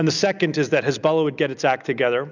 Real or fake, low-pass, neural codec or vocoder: real; 7.2 kHz; none